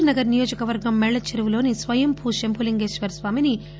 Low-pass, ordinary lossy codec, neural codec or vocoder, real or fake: none; none; none; real